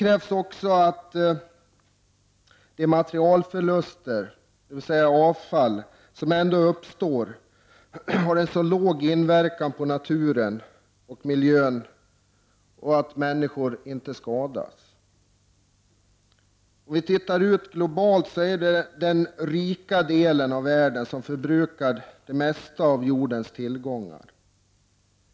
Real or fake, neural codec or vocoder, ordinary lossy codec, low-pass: real; none; none; none